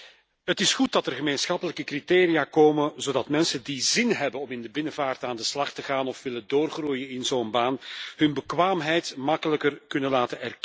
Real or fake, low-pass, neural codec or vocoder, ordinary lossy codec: real; none; none; none